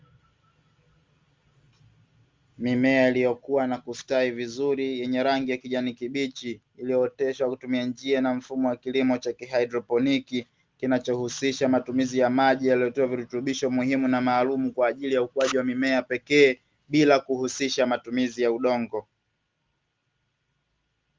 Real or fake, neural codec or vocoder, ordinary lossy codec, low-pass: real; none; Opus, 32 kbps; 7.2 kHz